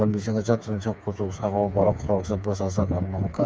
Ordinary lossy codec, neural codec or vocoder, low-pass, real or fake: none; codec, 16 kHz, 4 kbps, FreqCodec, smaller model; none; fake